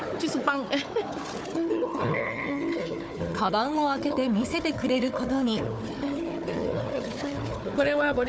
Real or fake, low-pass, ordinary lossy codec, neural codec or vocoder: fake; none; none; codec, 16 kHz, 4 kbps, FunCodec, trained on Chinese and English, 50 frames a second